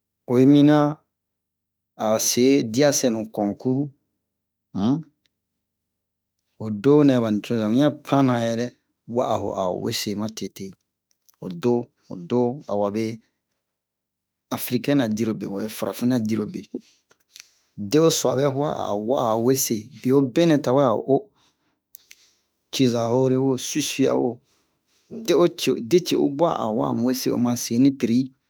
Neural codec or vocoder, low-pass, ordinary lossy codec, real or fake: autoencoder, 48 kHz, 32 numbers a frame, DAC-VAE, trained on Japanese speech; none; none; fake